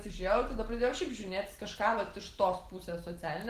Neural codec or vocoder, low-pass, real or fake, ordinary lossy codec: none; 14.4 kHz; real; Opus, 16 kbps